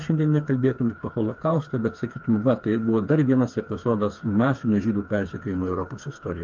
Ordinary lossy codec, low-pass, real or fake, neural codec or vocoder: Opus, 32 kbps; 7.2 kHz; fake; codec, 16 kHz, 4 kbps, FreqCodec, smaller model